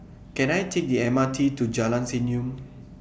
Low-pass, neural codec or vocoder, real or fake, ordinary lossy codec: none; none; real; none